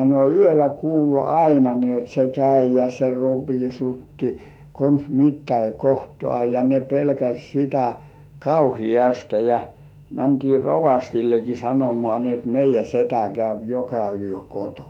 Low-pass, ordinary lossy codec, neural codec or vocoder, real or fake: 19.8 kHz; none; autoencoder, 48 kHz, 32 numbers a frame, DAC-VAE, trained on Japanese speech; fake